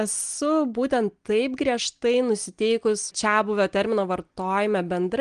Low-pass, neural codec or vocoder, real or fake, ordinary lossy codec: 9.9 kHz; none; real; Opus, 24 kbps